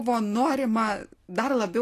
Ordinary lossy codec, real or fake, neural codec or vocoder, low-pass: AAC, 48 kbps; real; none; 14.4 kHz